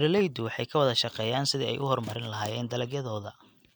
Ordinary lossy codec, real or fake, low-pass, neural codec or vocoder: none; real; none; none